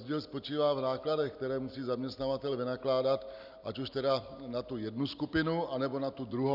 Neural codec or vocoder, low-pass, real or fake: none; 5.4 kHz; real